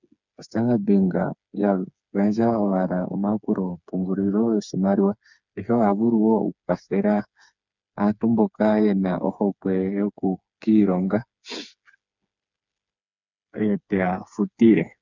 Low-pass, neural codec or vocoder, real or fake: 7.2 kHz; codec, 16 kHz, 4 kbps, FreqCodec, smaller model; fake